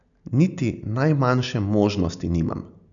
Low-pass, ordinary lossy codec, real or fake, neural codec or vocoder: 7.2 kHz; none; real; none